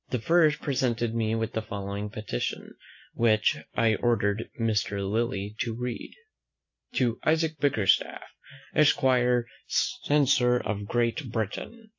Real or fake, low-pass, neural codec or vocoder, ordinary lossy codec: real; 7.2 kHz; none; AAC, 48 kbps